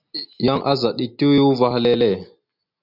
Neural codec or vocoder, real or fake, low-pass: none; real; 5.4 kHz